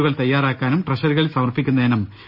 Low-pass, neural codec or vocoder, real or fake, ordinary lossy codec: 5.4 kHz; none; real; none